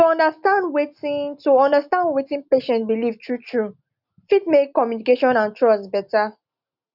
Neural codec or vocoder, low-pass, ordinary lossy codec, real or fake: none; 5.4 kHz; none; real